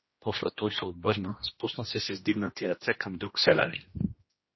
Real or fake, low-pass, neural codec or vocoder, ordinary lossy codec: fake; 7.2 kHz; codec, 16 kHz, 1 kbps, X-Codec, HuBERT features, trained on general audio; MP3, 24 kbps